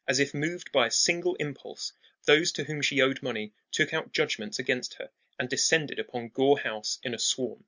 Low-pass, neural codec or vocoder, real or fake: 7.2 kHz; none; real